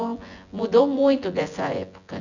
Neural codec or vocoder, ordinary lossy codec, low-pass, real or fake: vocoder, 24 kHz, 100 mel bands, Vocos; none; 7.2 kHz; fake